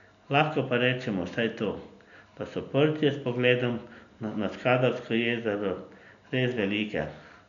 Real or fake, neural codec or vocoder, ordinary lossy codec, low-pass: real; none; none; 7.2 kHz